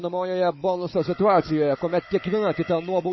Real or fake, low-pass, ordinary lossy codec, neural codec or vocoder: fake; 7.2 kHz; MP3, 24 kbps; codec, 16 kHz, 16 kbps, FunCodec, trained on Chinese and English, 50 frames a second